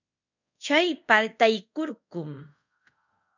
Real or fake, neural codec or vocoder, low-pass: fake; codec, 24 kHz, 0.5 kbps, DualCodec; 7.2 kHz